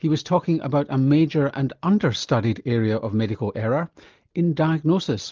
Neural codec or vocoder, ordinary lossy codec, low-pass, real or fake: none; Opus, 24 kbps; 7.2 kHz; real